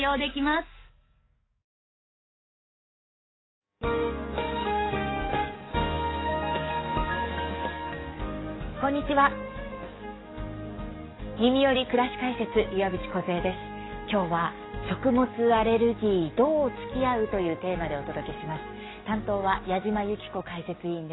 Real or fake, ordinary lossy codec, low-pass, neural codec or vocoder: fake; AAC, 16 kbps; 7.2 kHz; codec, 44.1 kHz, 7.8 kbps, DAC